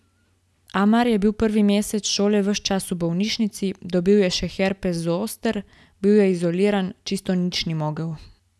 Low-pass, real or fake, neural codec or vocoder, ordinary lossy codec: none; real; none; none